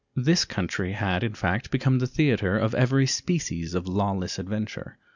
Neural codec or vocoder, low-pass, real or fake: none; 7.2 kHz; real